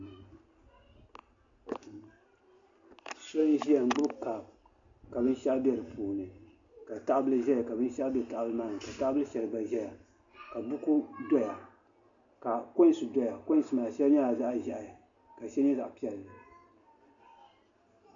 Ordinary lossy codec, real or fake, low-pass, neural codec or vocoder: AAC, 64 kbps; real; 7.2 kHz; none